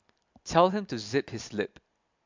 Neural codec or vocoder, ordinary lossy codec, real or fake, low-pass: none; MP3, 64 kbps; real; 7.2 kHz